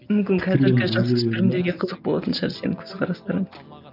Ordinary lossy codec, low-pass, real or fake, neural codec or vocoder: none; 5.4 kHz; real; none